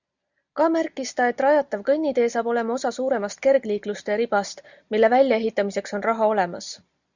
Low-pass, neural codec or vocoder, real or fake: 7.2 kHz; none; real